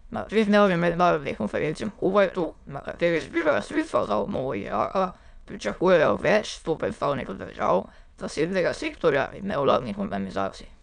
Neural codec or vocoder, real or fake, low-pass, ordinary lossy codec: autoencoder, 22.05 kHz, a latent of 192 numbers a frame, VITS, trained on many speakers; fake; 9.9 kHz; none